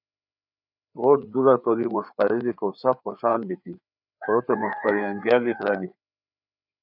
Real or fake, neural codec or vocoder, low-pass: fake; codec, 16 kHz, 4 kbps, FreqCodec, larger model; 5.4 kHz